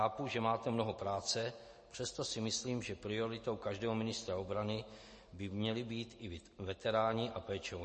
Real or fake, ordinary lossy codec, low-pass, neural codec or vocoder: real; MP3, 32 kbps; 10.8 kHz; none